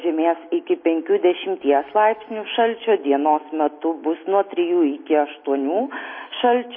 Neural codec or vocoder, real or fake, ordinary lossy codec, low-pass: none; real; MP3, 24 kbps; 5.4 kHz